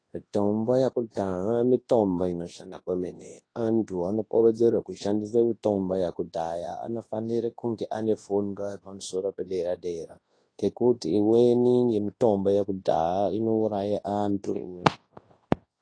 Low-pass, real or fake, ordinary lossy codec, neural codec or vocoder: 9.9 kHz; fake; AAC, 32 kbps; codec, 24 kHz, 0.9 kbps, WavTokenizer, large speech release